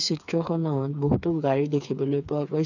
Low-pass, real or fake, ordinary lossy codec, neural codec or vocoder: 7.2 kHz; fake; none; codec, 16 kHz, 4 kbps, FreqCodec, smaller model